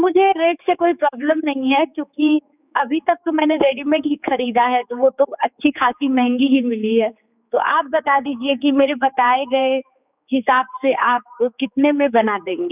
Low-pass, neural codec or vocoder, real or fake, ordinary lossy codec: 3.6 kHz; codec, 16 kHz, 4 kbps, X-Codec, HuBERT features, trained on general audio; fake; none